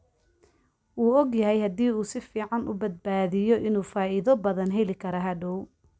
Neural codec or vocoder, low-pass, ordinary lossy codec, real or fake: none; none; none; real